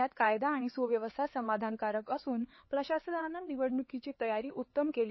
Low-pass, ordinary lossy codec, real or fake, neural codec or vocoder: 7.2 kHz; MP3, 24 kbps; fake; codec, 16 kHz, 4 kbps, X-Codec, HuBERT features, trained on LibriSpeech